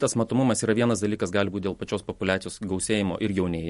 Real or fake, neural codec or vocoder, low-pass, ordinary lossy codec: real; none; 14.4 kHz; MP3, 48 kbps